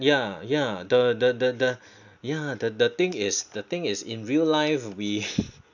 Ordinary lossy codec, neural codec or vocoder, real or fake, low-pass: none; none; real; 7.2 kHz